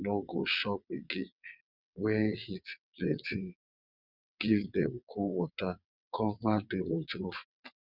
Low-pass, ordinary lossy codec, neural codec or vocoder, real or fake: 5.4 kHz; none; vocoder, 22.05 kHz, 80 mel bands, WaveNeXt; fake